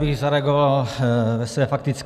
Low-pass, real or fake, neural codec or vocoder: 14.4 kHz; real; none